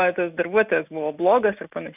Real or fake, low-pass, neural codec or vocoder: real; 3.6 kHz; none